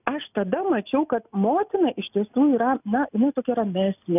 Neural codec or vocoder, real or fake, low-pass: none; real; 3.6 kHz